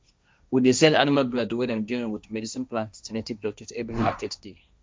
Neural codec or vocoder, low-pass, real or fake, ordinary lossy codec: codec, 16 kHz, 1.1 kbps, Voila-Tokenizer; none; fake; none